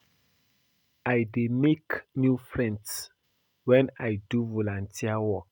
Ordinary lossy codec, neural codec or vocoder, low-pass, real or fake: none; none; 19.8 kHz; real